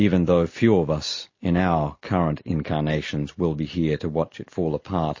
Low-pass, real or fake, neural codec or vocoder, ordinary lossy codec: 7.2 kHz; real; none; MP3, 32 kbps